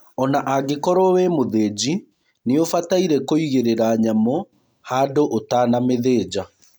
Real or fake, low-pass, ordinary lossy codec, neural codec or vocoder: real; none; none; none